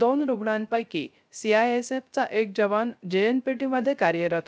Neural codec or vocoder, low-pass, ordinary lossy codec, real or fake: codec, 16 kHz, 0.3 kbps, FocalCodec; none; none; fake